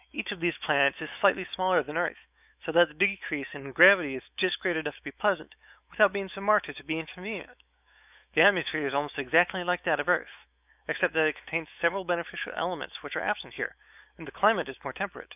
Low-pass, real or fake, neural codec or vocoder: 3.6 kHz; fake; autoencoder, 48 kHz, 128 numbers a frame, DAC-VAE, trained on Japanese speech